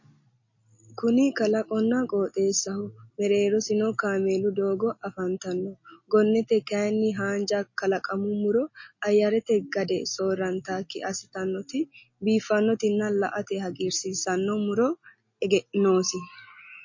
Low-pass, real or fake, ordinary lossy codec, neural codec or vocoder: 7.2 kHz; real; MP3, 32 kbps; none